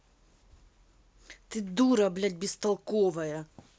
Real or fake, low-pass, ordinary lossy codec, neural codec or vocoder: real; none; none; none